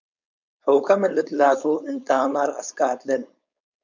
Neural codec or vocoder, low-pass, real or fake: codec, 16 kHz, 4.8 kbps, FACodec; 7.2 kHz; fake